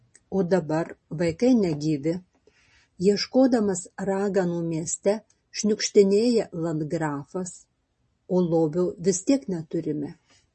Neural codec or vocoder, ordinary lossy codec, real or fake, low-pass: none; MP3, 32 kbps; real; 10.8 kHz